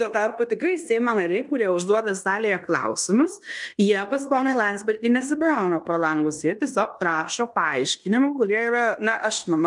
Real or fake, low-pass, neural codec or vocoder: fake; 10.8 kHz; codec, 16 kHz in and 24 kHz out, 0.9 kbps, LongCat-Audio-Codec, fine tuned four codebook decoder